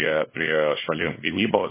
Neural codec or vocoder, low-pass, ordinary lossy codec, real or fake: codec, 24 kHz, 0.9 kbps, WavTokenizer, small release; 3.6 kHz; MP3, 16 kbps; fake